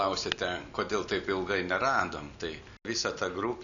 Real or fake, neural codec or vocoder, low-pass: real; none; 7.2 kHz